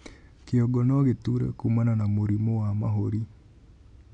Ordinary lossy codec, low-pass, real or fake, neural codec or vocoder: none; 9.9 kHz; real; none